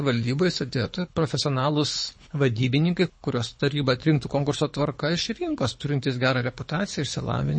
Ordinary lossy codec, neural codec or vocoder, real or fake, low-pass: MP3, 32 kbps; codec, 24 kHz, 6 kbps, HILCodec; fake; 9.9 kHz